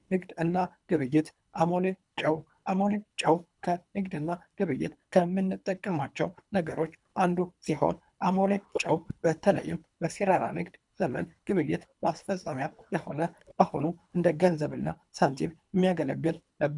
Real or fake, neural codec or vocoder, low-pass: fake; codec, 24 kHz, 3 kbps, HILCodec; 10.8 kHz